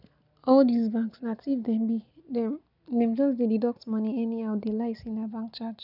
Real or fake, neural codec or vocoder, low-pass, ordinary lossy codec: real; none; 5.4 kHz; none